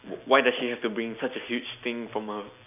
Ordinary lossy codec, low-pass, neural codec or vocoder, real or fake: none; 3.6 kHz; none; real